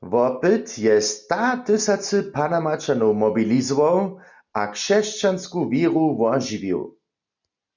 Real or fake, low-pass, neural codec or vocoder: real; 7.2 kHz; none